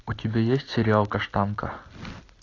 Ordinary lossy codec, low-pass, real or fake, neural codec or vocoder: AAC, 32 kbps; 7.2 kHz; real; none